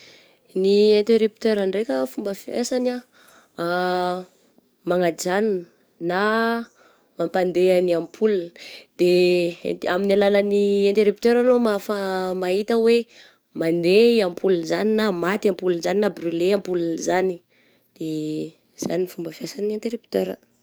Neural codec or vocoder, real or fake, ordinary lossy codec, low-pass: codec, 44.1 kHz, 7.8 kbps, DAC; fake; none; none